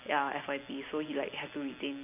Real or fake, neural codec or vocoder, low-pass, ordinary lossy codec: real; none; 3.6 kHz; none